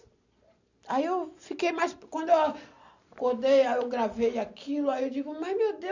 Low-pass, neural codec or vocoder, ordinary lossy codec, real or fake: 7.2 kHz; none; none; real